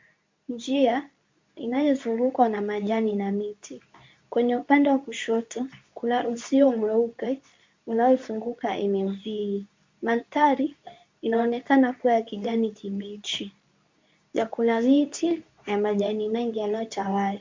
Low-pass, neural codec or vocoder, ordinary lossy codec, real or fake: 7.2 kHz; codec, 24 kHz, 0.9 kbps, WavTokenizer, medium speech release version 2; MP3, 48 kbps; fake